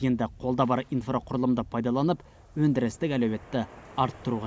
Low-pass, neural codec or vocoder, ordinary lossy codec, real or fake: none; none; none; real